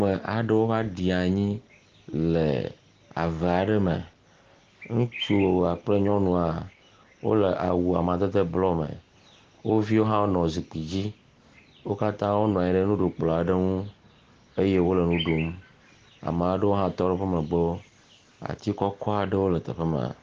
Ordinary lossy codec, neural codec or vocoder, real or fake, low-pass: Opus, 16 kbps; none; real; 7.2 kHz